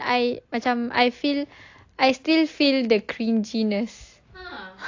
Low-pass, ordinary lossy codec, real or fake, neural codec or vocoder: 7.2 kHz; AAC, 48 kbps; real; none